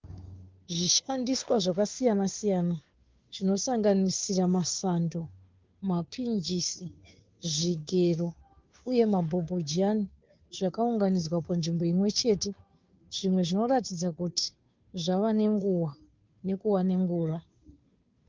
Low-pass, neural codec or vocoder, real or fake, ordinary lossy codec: 7.2 kHz; codec, 16 kHz, 2 kbps, FunCodec, trained on Chinese and English, 25 frames a second; fake; Opus, 32 kbps